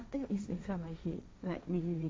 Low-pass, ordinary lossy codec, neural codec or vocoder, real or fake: none; none; codec, 16 kHz, 1.1 kbps, Voila-Tokenizer; fake